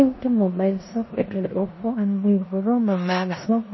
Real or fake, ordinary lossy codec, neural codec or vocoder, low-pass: fake; MP3, 24 kbps; codec, 16 kHz in and 24 kHz out, 0.9 kbps, LongCat-Audio-Codec, four codebook decoder; 7.2 kHz